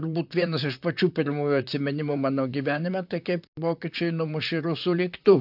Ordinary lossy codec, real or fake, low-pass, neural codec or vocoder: AAC, 48 kbps; fake; 5.4 kHz; vocoder, 44.1 kHz, 128 mel bands, Pupu-Vocoder